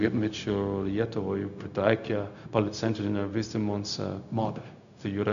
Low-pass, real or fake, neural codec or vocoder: 7.2 kHz; fake; codec, 16 kHz, 0.4 kbps, LongCat-Audio-Codec